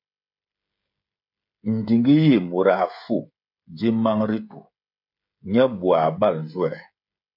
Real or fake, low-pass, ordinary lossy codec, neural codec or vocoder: fake; 5.4 kHz; MP3, 48 kbps; codec, 16 kHz, 16 kbps, FreqCodec, smaller model